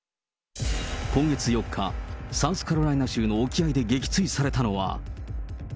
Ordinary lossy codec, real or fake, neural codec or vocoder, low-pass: none; real; none; none